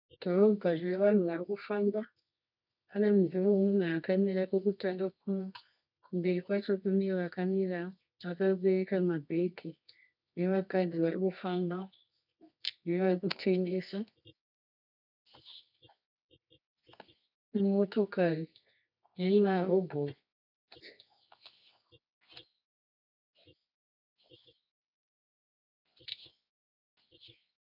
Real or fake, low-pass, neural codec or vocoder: fake; 5.4 kHz; codec, 24 kHz, 0.9 kbps, WavTokenizer, medium music audio release